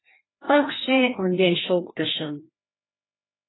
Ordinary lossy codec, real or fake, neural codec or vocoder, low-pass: AAC, 16 kbps; fake; codec, 16 kHz, 1 kbps, FreqCodec, larger model; 7.2 kHz